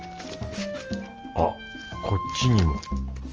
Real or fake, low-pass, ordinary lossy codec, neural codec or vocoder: real; 7.2 kHz; Opus, 24 kbps; none